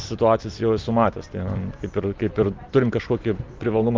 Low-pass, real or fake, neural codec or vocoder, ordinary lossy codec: 7.2 kHz; real; none; Opus, 32 kbps